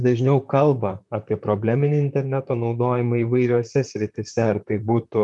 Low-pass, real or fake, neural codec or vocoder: 10.8 kHz; fake; vocoder, 44.1 kHz, 128 mel bands, Pupu-Vocoder